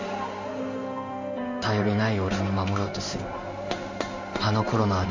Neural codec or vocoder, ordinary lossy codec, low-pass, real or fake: codec, 16 kHz in and 24 kHz out, 1 kbps, XY-Tokenizer; MP3, 64 kbps; 7.2 kHz; fake